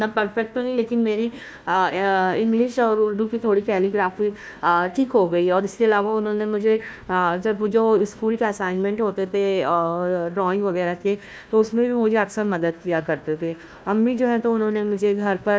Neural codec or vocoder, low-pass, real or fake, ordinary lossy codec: codec, 16 kHz, 1 kbps, FunCodec, trained on Chinese and English, 50 frames a second; none; fake; none